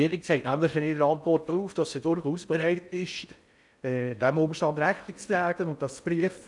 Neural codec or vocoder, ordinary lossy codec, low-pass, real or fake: codec, 16 kHz in and 24 kHz out, 0.6 kbps, FocalCodec, streaming, 4096 codes; none; 10.8 kHz; fake